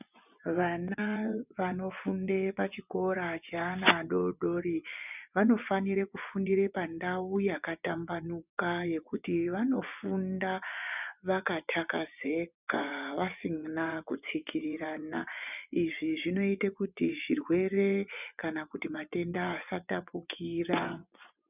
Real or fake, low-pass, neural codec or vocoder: fake; 3.6 kHz; vocoder, 24 kHz, 100 mel bands, Vocos